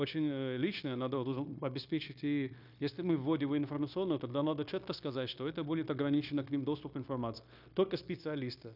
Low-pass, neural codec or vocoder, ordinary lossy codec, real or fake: 5.4 kHz; codec, 16 kHz, 0.9 kbps, LongCat-Audio-Codec; none; fake